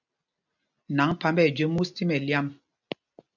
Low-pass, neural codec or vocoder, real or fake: 7.2 kHz; none; real